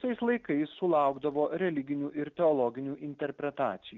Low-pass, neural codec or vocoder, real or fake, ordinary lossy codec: 7.2 kHz; none; real; Opus, 32 kbps